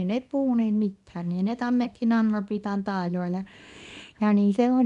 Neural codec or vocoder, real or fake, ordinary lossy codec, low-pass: codec, 24 kHz, 0.9 kbps, WavTokenizer, small release; fake; none; 10.8 kHz